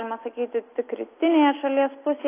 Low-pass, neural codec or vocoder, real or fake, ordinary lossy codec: 3.6 kHz; none; real; AAC, 24 kbps